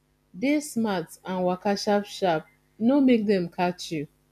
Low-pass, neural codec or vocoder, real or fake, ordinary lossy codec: 14.4 kHz; none; real; AAC, 96 kbps